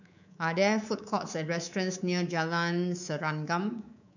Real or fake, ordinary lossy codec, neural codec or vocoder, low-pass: fake; none; codec, 24 kHz, 3.1 kbps, DualCodec; 7.2 kHz